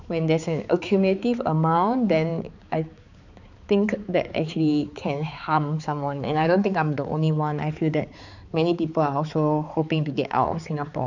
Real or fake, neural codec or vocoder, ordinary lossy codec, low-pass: fake; codec, 16 kHz, 4 kbps, X-Codec, HuBERT features, trained on balanced general audio; none; 7.2 kHz